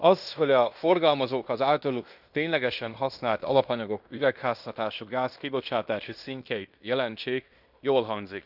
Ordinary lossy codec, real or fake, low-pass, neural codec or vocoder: none; fake; 5.4 kHz; codec, 16 kHz in and 24 kHz out, 0.9 kbps, LongCat-Audio-Codec, fine tuned four codebook decoder